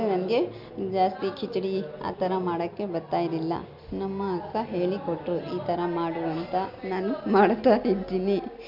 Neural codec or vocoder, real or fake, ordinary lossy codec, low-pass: none; real; MP3, 48 kbps; 5.4 kHz